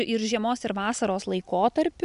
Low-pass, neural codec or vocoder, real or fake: 10.8 kHz; none; real